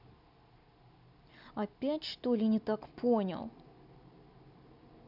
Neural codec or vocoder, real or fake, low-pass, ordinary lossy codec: none; real; 5.4 kHz; none